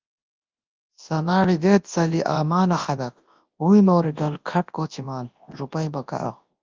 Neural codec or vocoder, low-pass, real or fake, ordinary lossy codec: codec, 24 kHz, 0.9 kbps, WavTokenizer, large speech release; 7.2 kHz; fake; Opus, 32 kbps